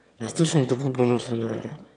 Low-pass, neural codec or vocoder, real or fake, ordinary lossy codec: 9.9 kHz; autoencoder, 22.05 kHz, a latent of 192 numbers a frame, VITS, trained on one speaker; fake; none